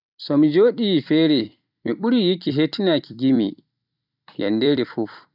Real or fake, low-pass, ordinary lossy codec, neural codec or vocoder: fake; 5.4 kHz; none; vocoder, 44.1 kHz, 128 mel bands every 256 samples, BigVGAN v2